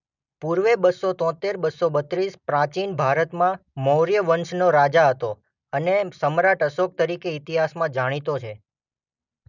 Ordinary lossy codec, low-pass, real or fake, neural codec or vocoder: none; 7.2 kHz; real; none